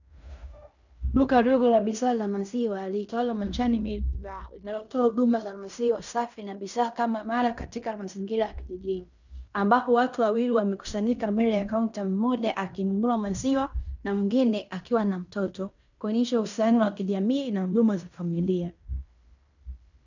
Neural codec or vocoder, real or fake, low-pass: codec, 16 kHz in and 24 kHz out, 0.9 kbps, LongCat-Audio-Codec, fine tuned four codebook decoder; fake; 7.2 kHz